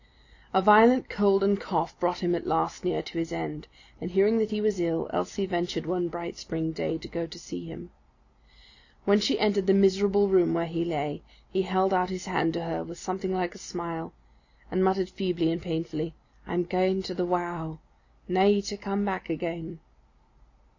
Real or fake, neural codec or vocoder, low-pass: real; none; 7.2 kHz